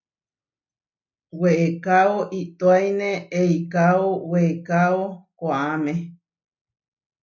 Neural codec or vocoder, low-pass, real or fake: none; 7.2 kHz; real